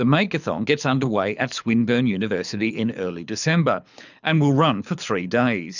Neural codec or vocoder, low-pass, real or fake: codec, 24 kHz, 6 kbps, HILCodec; 7.2 kHz; fake